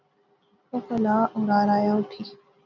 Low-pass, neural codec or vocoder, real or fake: 7.2 kHz; none; real